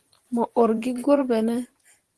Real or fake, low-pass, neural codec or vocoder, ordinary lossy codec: fake; 10.8 kHz; vocoder, 44.1 kHz, 128 mel bands every 512 samples, BigVGAN v2; Opus, 16 kbps